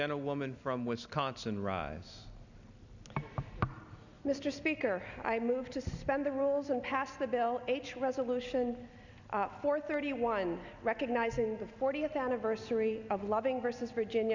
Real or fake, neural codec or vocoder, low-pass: real; none; 7.2 kHz